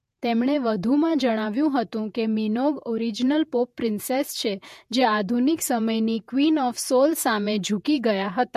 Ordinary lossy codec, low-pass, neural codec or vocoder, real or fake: MP3, 64 kbps; 14.4 kHz; vocoder, 48 kHz, 128 mel bands, Vocos; fake